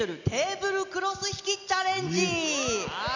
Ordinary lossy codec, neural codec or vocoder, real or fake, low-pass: none; none; real; 7.2 kHz